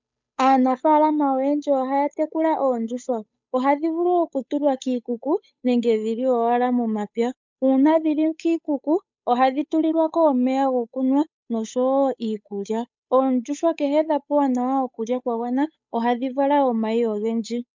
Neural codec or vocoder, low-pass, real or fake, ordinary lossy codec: codec, 16 kHz, 8 kbps, FunCodec, trained on Chinese and English, 25 frames a second; 7.2 kHz; fake; MP3, 64 kbps